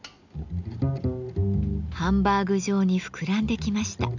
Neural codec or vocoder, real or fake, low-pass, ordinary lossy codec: none; real; 7.2 kHz; none